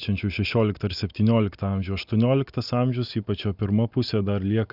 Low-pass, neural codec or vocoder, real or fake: 5.4 kHz; none; real